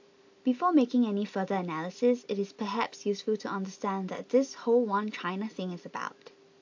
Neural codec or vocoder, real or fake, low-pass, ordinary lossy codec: none; real; 7.2 kHz; AAC, 48 kbps